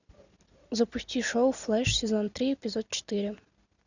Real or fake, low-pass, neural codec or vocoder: real; 7.2 kHz; none